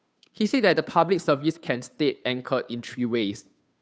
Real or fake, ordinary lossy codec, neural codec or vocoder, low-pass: fake; none; codec, 16 kHz, 2 kbps, FunCodec, trained on Chinese and English, 25 frames a second; none